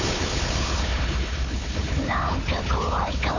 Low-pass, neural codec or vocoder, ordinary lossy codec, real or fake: 7.2 kHz; codec, 16 kHz, 4 kbps, FunCodec, trained on Chinese and English, 50 frames a second; none; fake